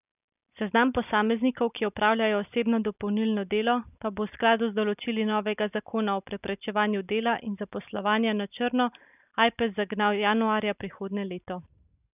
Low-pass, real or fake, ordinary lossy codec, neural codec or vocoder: 3.6 kHz; real; none; none